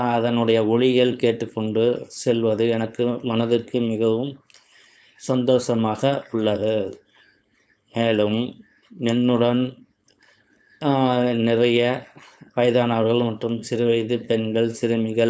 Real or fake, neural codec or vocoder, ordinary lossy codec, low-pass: fake; codec, 16 kHz, 4.8 kbps, FACodec; none; none